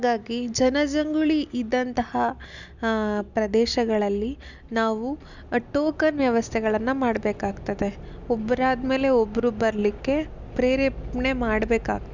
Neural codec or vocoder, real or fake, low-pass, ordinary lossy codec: none; real; 7.2 kHz; none